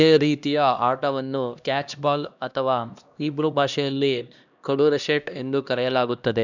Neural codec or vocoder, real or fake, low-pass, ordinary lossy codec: codec, 16 kHz, 1 kbps, X-Codec, HuBERT features, trained on LibriSpeech; fake; 7.2 kHz; none